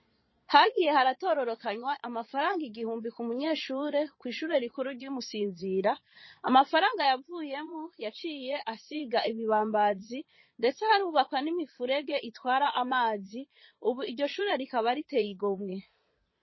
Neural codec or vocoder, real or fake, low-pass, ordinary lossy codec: vocoder, 22.05 kHz, 80 mel bands, Vocos; fake; 7.2 kHz; MP3, 24 kbps